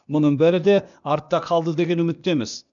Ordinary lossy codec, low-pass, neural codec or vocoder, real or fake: none; 7.2 kHz; codec, 16 kHz, 0.8 kbps, ZipCodec; fake